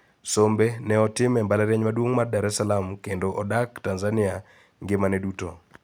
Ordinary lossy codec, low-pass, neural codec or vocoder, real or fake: none; none; none; real